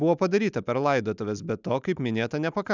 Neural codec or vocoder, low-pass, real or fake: none; 7.2 kHz; real